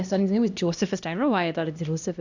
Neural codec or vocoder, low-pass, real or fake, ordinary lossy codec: codec, 16 kHz, 1 kbps, X-Codec, HuBERT features, trained on LibriSpeech; 7.2 kHz; fake; none